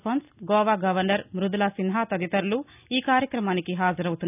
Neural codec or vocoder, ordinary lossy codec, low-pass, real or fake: none; none; 3.6 kHz; real